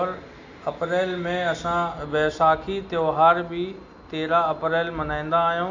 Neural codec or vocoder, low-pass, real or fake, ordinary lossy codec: none; 7.2 kHz; real; AAC, 48 kbps